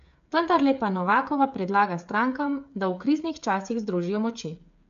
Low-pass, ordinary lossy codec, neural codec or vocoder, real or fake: 7.2 kHz; AAC, 64 kbps; codec, 16 kHz, 8 kbps, FreqCodec, smaller model; fake